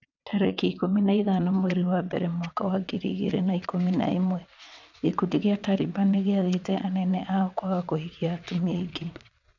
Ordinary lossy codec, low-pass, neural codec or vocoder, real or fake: none; 7.2 kHz; vocoder, 22.05 kHz, 80 mel bands, WaveNeXt; fake